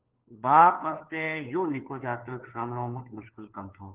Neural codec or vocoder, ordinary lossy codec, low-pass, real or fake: codec, 16 kHz, 4 kbps, FunCodec, trained on LibriTTS, 50 frames a second; Opus, 16 kbps; 3.6 kHz; fake